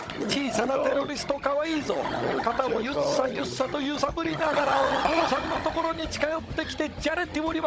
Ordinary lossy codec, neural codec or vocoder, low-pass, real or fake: none; codec, 16 kHz, 16 kbps, FunCodec, trained on Chinese and English, 50 frames a second; none; fake